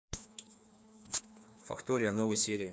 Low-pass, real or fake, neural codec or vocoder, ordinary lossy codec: none; fake; codec, 16 kHz, 2 kbps, FreqCodec, larger model; none